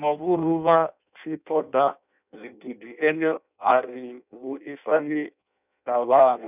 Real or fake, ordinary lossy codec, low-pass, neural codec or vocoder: fake; none; 3.6 kHz; codec, 16 kHz in and 24 kHz out, 0.6 kbps, FireRedTTS-2 codec